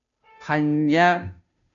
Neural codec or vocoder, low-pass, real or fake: codec, 16 kHz, 0.5 kbps, FunCodec, trained on Chinese and English, 25 frames a second; 7.2 kHz; fake